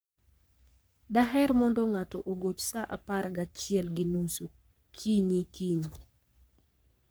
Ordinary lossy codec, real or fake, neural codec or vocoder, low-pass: none; fake; codec, 44.1 kHz, 3.4 kbps, Pupu-Codec; none